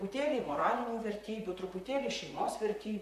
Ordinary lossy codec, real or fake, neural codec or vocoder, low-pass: MP3, 64 kbps; fake; vocoder, 44.1 kHz, 128 mel bands, Pupu-Vocoder; 14.4 kHz